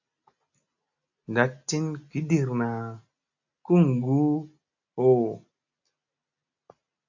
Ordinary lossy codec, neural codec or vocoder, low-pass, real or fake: AAC, 48 kbps; none; 7.2 kHz; real